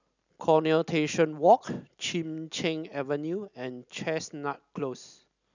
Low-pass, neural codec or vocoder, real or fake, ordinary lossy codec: 7.2 kHz; none; real; none